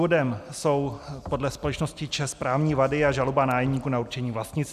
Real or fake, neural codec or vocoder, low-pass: real; none; 14.4 kHz